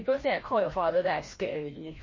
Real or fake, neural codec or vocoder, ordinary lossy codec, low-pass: fake; codec, 16 kHz, 1 kbps, FreqCodec, larger model; MP3, 32 kbps; 7.2 kHz